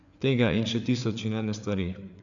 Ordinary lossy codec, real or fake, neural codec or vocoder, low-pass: none; fake; codec, 16 kHz, 4 kbps, FreqCodec, larger model; 7.2 kHz